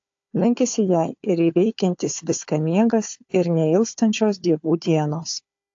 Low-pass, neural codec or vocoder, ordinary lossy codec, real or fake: 7.2 kHz; codec, 16 kHz, 4 kbps, FunCodec, trained on Chinese and English, 50 frames a second; AAC, 48 kbps; fake